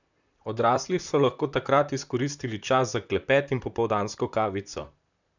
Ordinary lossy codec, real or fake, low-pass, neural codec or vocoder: none; fake; 7.2 kHz; vocoder, 44.1 kHz, 128 mel bands, Pupu-Vocoder